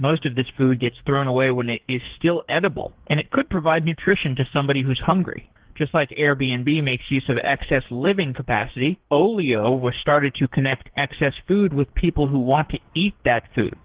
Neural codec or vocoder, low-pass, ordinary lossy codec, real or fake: codec, 44.1 kHz, 2.6 kbps, SNAC; 3.6 kHz; Opus, 16 kbps; fake